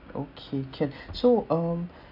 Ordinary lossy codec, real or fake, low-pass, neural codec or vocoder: none; real; 5.4 kHz; none